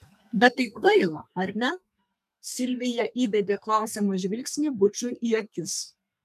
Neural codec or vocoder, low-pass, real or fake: codec, 32 kHz, 1.9 kbps, SNAC; 14.4 kHz; fake